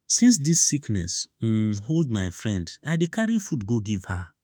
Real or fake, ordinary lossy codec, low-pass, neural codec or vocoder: fake; none; none; autoencoder, 48 kHz, 32 numbers a frame, DAC-VAE, trained on Japanese speech